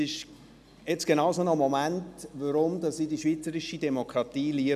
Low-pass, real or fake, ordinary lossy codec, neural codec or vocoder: 14.4 kHz; real; none; none